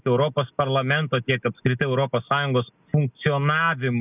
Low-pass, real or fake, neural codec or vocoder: 3.6 kHz; real; none